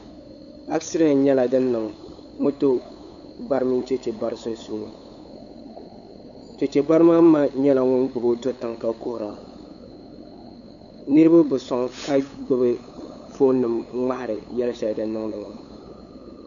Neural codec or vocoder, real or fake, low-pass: codec, 16 kHz, 8 kbps, FunCodec, trained on LibriTTS, 25 frames a second; fake; 7.2 kHz